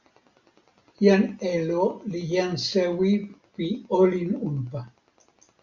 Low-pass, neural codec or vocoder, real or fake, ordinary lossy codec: 7.2 kHz; none; real; Opus, 64 kbps